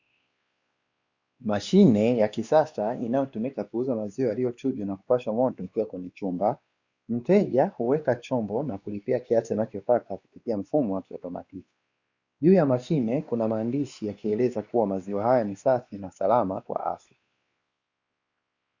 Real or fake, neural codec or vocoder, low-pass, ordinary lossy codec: fake; codec, 16 kHz, 2 kbps, X-Codec, WavLM features, trained on Multilingual LibriSpeech; 7.2 kHz; Opus, 64 kbps